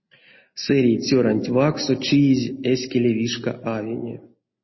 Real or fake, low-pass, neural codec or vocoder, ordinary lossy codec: real; 7.2 kHz; none; MP3, 24 kbps